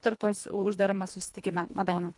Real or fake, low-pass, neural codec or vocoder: fake; 10.8 kHz; codec, 24 kHz, 1.5 kbps, HILCodec